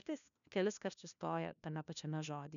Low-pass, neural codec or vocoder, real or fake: 7.2 kHz; codec, 16 kHz, 1 kbps, FunCodec, trained on LibriTTS, 50 frames a second; fake